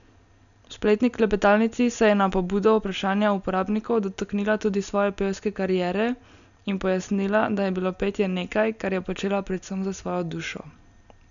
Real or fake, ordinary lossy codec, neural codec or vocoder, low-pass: real; none; none; 7.2 kHz